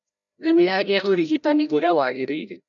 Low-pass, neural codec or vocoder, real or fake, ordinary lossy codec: 7.2 kHz; codec, 16 kHz, 0.5 kbps, FreqCodec, larger model; fake; none